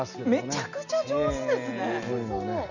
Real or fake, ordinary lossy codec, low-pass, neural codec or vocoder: real; none; 7.2 kHz; none